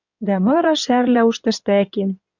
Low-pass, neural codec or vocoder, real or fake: 7.2 kHz; codec, 16 kHz in and 24 kHz out, 2.2 kbps, FireRedTTS-2 codec; fake